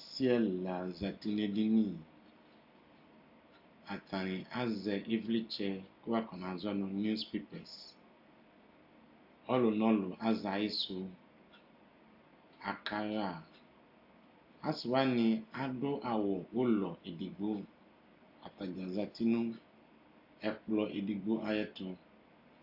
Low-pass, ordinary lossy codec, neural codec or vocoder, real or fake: 5.4 kHz; AAC, 48 kbps; none; real